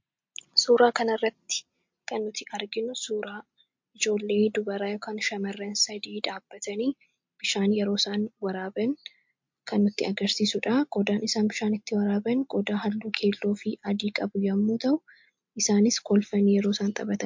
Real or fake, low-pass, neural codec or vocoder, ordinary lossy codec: real; 7.2 kHz; none; MP3, 48 kbps